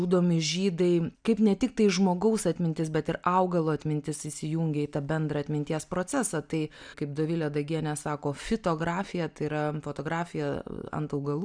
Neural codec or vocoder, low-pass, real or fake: none; 9.9 kHz; real